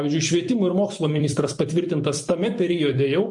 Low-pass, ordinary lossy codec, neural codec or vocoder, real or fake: 10.8 kHz; MP3, 48 kbps; vocoder, 44.1 kHz, 128 mel bands every 256 samples, BigVGAN v2; fake